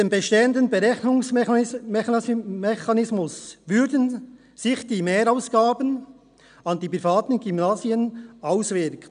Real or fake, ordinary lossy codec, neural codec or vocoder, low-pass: real; none; none; 9.9 kHz